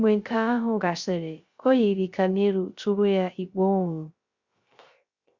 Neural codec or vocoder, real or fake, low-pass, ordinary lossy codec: codec, 16 kHz, 0.3 kbps, FocalCodec; fake; 7.2 kHz; Opus, 64 kbps